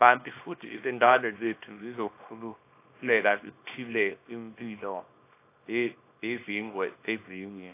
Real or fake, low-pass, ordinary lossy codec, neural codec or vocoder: fake; 3.6 kHz; AAC, 24 kbps; codec, 24 kHz, 0.9 kbps, WavTokenizer, small release